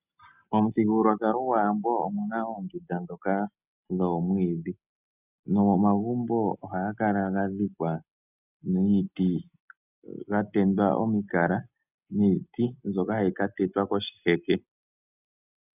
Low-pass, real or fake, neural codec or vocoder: 3.6 kHz; real; none